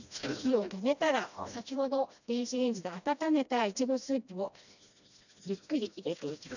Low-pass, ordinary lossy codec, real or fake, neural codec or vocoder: 7.2 kHz; none; fake; codec, 16 kHz, 1 kbps, FreqCodec, smaller model